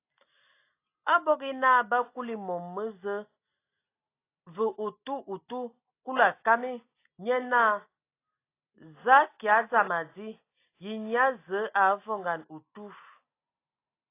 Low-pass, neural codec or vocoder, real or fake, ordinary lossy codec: 3.6 kHz; none; real; AAC, 24 kbps